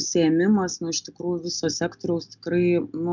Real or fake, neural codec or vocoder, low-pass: real; none; 7.2 kHz